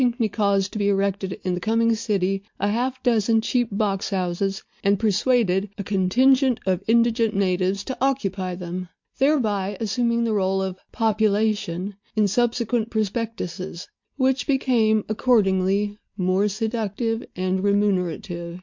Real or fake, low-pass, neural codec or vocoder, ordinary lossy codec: real; 7.2 kHz; none; MP3, 48 kbps